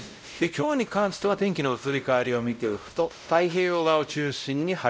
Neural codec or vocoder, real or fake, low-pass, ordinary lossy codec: codec, 16 kHz, 0.5 kbps, X-Codec, WavLM features, trained on Multilingual LibriSpeech; fake; none; none